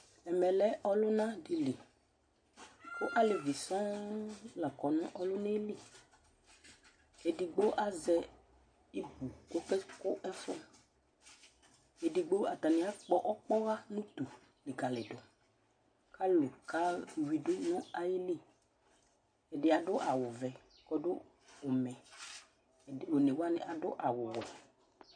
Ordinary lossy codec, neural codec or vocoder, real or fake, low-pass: MP3, 48 kbps; none; real; 9.9 kHz